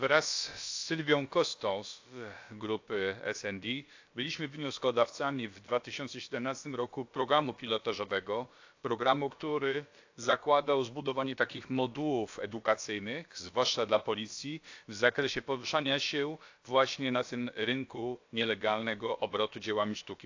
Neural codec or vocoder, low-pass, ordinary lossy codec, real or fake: codec, 16 kHz, about 1 kbps, DyCAST, with the encoder's durations; 7.2 kHz; AAC, 48 kbps; fake